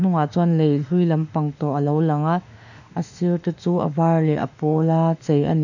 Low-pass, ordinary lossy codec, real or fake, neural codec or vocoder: 7.2 kHz; none; fake; autoencoder, 48 kHz, 32 numbers a frame, DAC-VAE, trained on Japanese speech